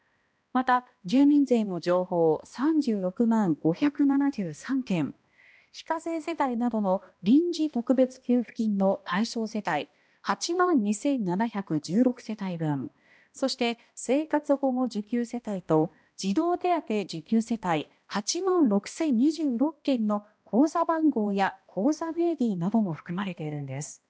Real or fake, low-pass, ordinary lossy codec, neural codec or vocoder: fake; none; none; codec, 16 kHz, 1 kbps, X-Codec, HuBERT features, trained on balanced general audio